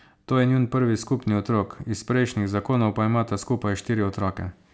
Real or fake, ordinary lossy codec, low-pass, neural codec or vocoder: real; none; none; none